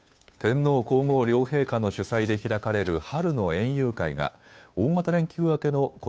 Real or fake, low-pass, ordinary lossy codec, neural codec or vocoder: fake; none; none; codec, 16 kHz, 2 kbps, FunCodec, trained on Chinese and English, 25 frames a second